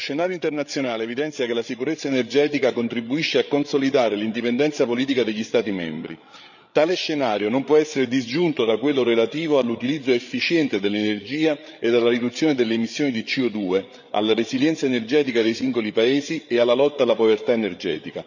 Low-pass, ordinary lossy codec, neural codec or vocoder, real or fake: 7.2 kHz; none; codec, 16 kHz, 8 kbps, FreqCodec, larger model; fake